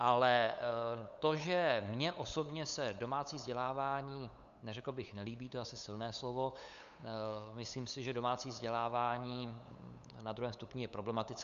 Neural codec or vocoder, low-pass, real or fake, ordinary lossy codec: codec, 16 kHz, 8 kbps, FunCodec, trained on LibriTTS, 25 frames a second; 7.2 kHz; fake; Opus, 64 kbps